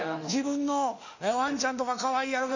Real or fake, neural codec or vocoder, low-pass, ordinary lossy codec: fake; autoencoder, 48 kHz, 32 numbers a frame, DAC-VAE, trained on Japanese speech; 7.2 kHz; none